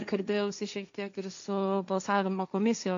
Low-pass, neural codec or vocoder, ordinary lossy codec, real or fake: 7.2 kHz; codec, 16 kHz, 1.1 kbps, Voila-Tokenizer; MP3, 64 kbps; fake